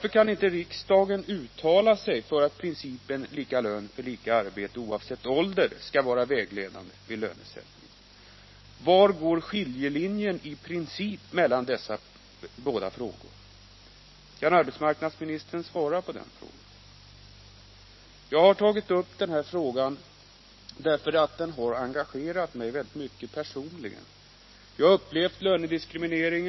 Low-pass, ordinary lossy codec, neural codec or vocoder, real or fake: 7.2 kHz; MP3, 24 kbps; none; real